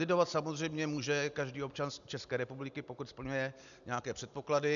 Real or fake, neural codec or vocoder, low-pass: real; none; 7.2 kHz